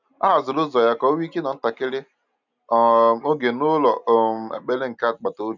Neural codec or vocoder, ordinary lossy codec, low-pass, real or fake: none; none; 7.2 kHz; real